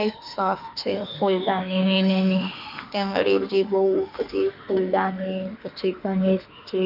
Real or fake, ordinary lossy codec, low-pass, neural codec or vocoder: fake; none; 5.4 kHz; autoencoder, 48 kHz, 32 numbers a frame, DAC-VAE, trained on Japanese speech